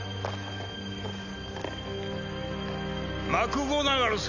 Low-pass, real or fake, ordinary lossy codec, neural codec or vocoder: 7.2 kHz; real; none; none